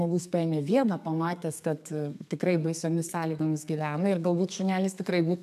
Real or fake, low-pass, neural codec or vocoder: fake; 14.4 kHz; codec, 32 kHz, 1.9 kbps, SNAC